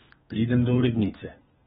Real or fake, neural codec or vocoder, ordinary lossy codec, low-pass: fake; codec, 32 kHz, 1.9 kbps, SNAC; AAC, 16 kbps; 14.4 kHz